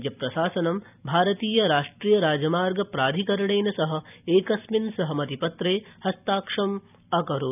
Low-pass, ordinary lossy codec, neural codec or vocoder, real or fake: 3.6 kHz; none; none; real